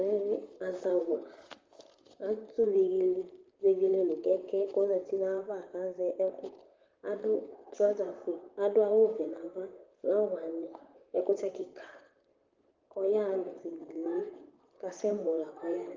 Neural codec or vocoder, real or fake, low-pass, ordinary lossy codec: vocoder, 44.1 kHz, 128 mel bands, Pupu-Vocoder; fake; 7.2 kHz; Opus, 32 kbps